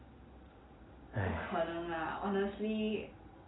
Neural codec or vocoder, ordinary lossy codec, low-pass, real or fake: none; AAC, 16 kbps; 7.2 kHz; real